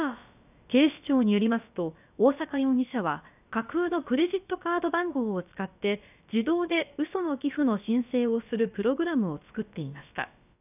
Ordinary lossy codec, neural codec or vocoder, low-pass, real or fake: none; codec, 16 kHz, about 1 kbps, DyCAST, with the encoder's durations; 3.6 kHz; fake